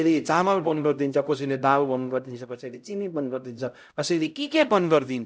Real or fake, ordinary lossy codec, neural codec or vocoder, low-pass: fake; none; codec, 16 kHz, 0.5 kbps, X-Codec, HuBERT features, trained on LibriSpeech; none